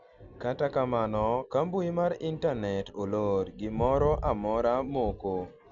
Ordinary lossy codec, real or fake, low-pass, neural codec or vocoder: none; real; 7.2 kHz; none